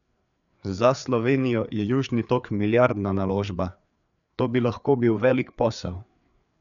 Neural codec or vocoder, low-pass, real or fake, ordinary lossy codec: codec, 16 kHz, 4 kbps, FreqCodec, larger model; 7.2 kHz; fake; none